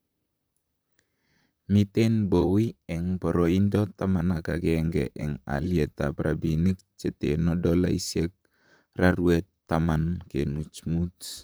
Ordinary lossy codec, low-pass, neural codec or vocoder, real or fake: none; none; vocoder, 44.1 kHz, 128 mel bands, Pupu-Vocoder; fake